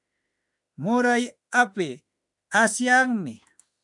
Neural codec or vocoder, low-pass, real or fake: autoencoder, 48 kHz, 32 numbers a frame, DAC-VAE, trained on Japanese speech; 10.8 kHz; fake